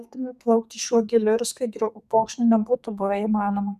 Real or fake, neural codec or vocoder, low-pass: fake; codec, 32 kHz, 1.9 kbps, SNAC; 14.4 kHz